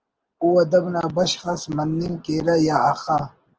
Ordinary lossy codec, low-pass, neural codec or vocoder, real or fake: Opus, 16 kbps; 7.2 kHz; none; real